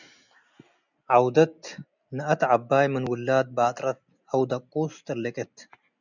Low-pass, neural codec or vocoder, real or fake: 7.2 kHz; none; real